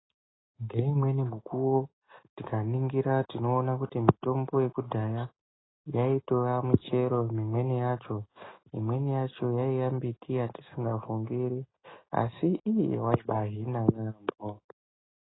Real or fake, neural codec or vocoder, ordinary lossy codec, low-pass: real; none; AAC, 16 kbps; 7.2 kHz